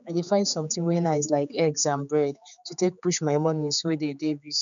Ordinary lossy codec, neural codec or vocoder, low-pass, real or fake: MP3, 96 kbps; codec, 16 kHz, 4 kbps, X-Codec, HuBERT features, trained on general audio; 7.2 kHz; fake